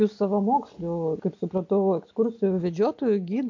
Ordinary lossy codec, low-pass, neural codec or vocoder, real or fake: AAC, 48 kbps; 7.2 kHz; none; real